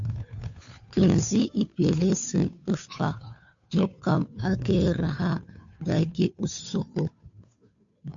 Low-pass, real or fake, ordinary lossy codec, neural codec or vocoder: 7.2 kHz; fake; MP3, 64 kbps; codec, 16 kHz, 2 kbps, FunCodec, trained on Chinese and English, 25 frames a second